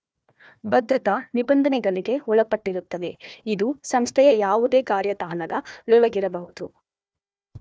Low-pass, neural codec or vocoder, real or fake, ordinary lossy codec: none; codec, 16 kHz, 1 kbps, FunCodec, trained on Chinese and English, 50 frames a second; fake; none